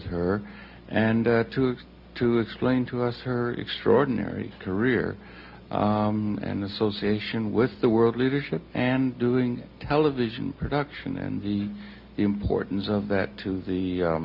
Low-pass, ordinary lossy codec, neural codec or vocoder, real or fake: 5.4 kHz; MP3, 48 kbps; none; real